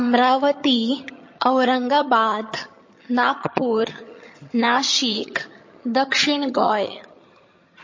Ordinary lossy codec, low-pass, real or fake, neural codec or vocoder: MP3, 32 kbps; 7.2 kHz; fake; vocoder, 22.05 kHz, 80 mel bands, HiFi-GAN